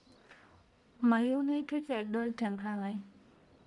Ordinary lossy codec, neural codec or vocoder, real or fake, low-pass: none; codec, 44.1 kHz, 1.7 kbps, Pupu-Codec; fake; 10.8 kHz